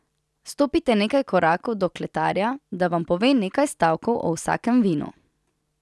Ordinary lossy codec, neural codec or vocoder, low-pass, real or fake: none; none; none; real